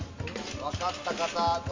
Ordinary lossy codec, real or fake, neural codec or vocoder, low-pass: MP3, 48 kbps; real; none; 7.2 kHz